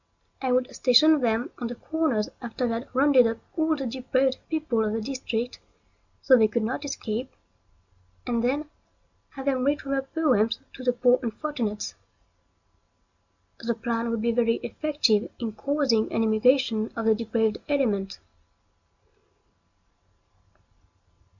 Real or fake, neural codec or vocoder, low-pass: real; none; 7.2 kHz